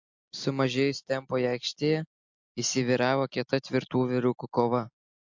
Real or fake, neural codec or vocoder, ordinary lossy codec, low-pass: real; none; MP3, 48 kbps; 7.2 kHz